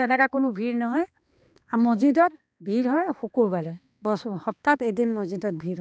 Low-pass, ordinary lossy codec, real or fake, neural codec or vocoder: none; none; fake; codec, 16 kHz, 2 kbps, X-Codec, HuBERT features, trained on balanced general audio